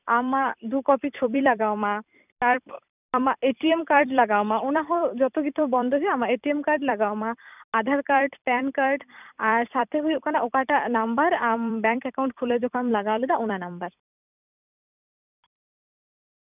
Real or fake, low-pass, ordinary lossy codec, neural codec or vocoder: fake; 3.6 kHz; none; vocoder, 44.1 kHz, 128 mel bands every 256 samples, BigVGAN v2